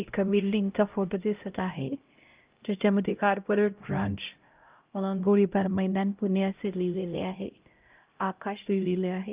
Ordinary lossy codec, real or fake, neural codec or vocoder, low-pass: Opus, 32 kbps; fake; codec, 16 kHz, 0.5 kbps, X-Codec, HuBERT features, trained on LibriSpeech; 3.6 kHz